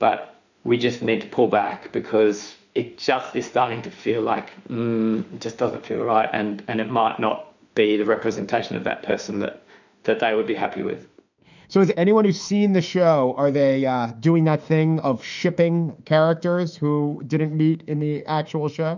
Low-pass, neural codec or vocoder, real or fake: 7.2 kHz; autoencoder, 48 kHz, 32 numbers a frame, DAC-VAE, trained on Japanese speech; fake